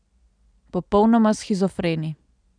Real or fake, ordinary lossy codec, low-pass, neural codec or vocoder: real; none; 9.9 kHz; none